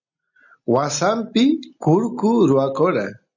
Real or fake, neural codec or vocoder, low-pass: real; none; 7.2 kHz